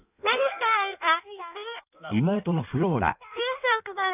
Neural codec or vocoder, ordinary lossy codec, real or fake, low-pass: codec, 16 kHz in and 24 kHz out, 1.1 kbps, FireRedTTS-2 codec; none; fake; 3.6 kHz